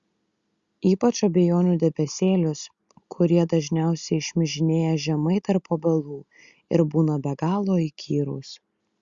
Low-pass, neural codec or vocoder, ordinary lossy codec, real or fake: 7.2 kHz; none; Opus, 64 kbps; real